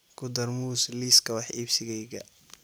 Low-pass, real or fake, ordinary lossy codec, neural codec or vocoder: none; real; none; none